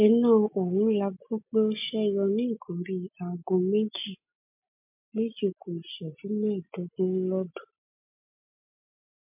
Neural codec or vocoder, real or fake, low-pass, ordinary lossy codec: none; real; 3.6 kHz; MP3, 32 kbps